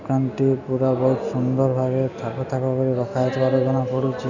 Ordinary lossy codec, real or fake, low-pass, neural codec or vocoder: none; real; 7.2 kHz; none